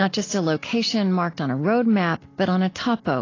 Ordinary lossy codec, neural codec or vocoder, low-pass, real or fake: AAC, 32 kbps; none; 7.2 kHz; real